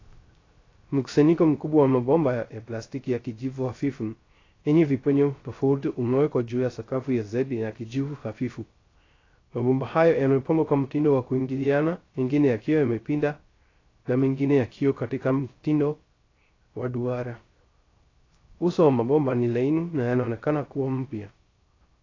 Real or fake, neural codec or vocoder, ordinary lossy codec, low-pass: fake; codec, 16 kHz, 0.3 kbps, FocalCodec; AAC, 32 kbps; 7.2 kHz